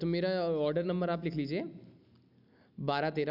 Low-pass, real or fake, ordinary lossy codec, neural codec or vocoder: 5.4 kHz; real; none; none